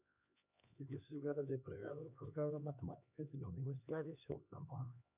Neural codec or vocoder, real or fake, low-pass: codec, 16 kHz, 2 kbps, X-Codec, HuBERT features, trained on LibriSpeech; fake; 3.6 kHz